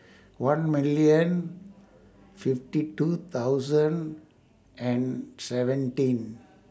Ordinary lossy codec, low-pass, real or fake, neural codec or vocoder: none; none; real; none